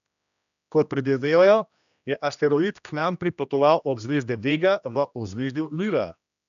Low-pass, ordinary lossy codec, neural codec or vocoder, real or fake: 7.2 kHz; none; codec, 16 kHz, 1 kbps, X-Codec, HuBERT features, trained on general audio; fake